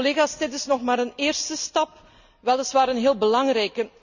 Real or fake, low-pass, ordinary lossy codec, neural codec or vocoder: real; 7.2 kHz; none; none